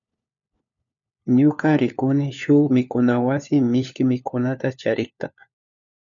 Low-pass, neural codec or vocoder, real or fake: 7.2 kHz; codec, 16 kHz, 4 kbps, FunCodec, trained on LibriTTS, 50 frames a second; fake